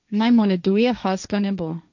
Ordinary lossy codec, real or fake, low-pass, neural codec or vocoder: none; fake; 7.2 kHz; codec, 16 kHz, 1.1 kbps, Voila-Tokenizer